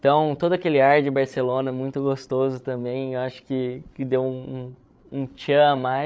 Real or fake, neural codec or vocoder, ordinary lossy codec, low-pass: fake; codec, 16 kHz, 16 kbps, FreqCodec, larger model; none; none